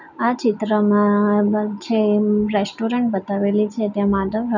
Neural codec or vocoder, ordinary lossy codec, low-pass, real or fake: none; none; 7.2 kHz; real